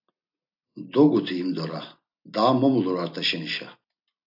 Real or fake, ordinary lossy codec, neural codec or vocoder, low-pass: real; AAC, 48 kbps; none; 5.4 kHz